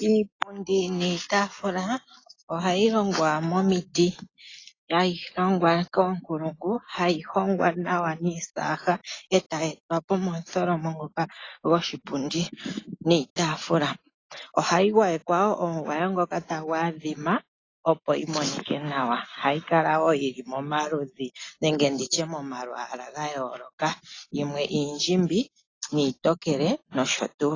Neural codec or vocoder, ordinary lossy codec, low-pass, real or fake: none; AAC, 32 kbps; 7.2 kHz; real